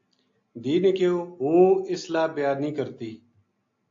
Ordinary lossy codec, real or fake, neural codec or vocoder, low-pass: AAC, 48 kbps; real; none; 7.2 kHz